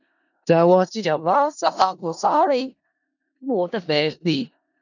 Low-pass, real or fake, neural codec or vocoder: 7.2 kHz; fake; codec, 16 kHz in and 24 kHz out, 0.4 kbps, LongCat-Audio-Codec, four codebook decoder